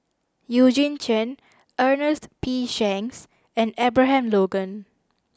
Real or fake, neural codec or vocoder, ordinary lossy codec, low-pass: real; none; none; none